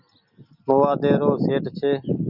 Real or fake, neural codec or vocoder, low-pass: real; none; 5.4 kHz